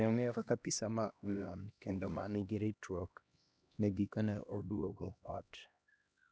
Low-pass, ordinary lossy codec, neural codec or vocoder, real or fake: none; none; codec, 16 kHz, 1 kbps, X-Codec, HuBERT features, trained on LibriSpeech; fake